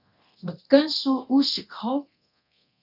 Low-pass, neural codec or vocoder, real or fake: 5.4 kHz; codec, 24 kHz, 0.9 kbps, DualCodec; fake